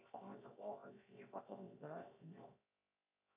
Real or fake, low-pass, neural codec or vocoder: fake; 3.6 kHz; codec, 16 kHz, 0.7 kbps, FocalCodec